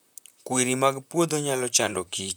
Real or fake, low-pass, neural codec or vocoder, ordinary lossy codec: fake; none; vocoder, 44.1 kHz, 128 mel bands, Pupu-Vocoder; none